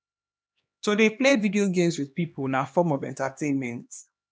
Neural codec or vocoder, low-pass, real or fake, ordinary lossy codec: codec, 16 kHz, 2 kbps, X-Codec, HuBERT features, trained on LibriSpeech; none; fake; none